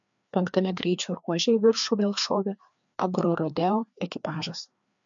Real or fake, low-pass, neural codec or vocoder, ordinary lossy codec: fake; 7.2 kHz; codec, 16 kHz, 2 kbps, FreqCodec, larger model; MP3, 64 kbps